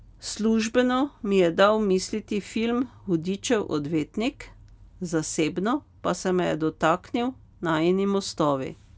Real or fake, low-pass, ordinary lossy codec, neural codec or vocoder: real; none; none; none